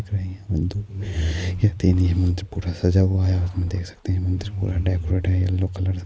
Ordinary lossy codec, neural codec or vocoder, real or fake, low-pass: none; none; real; none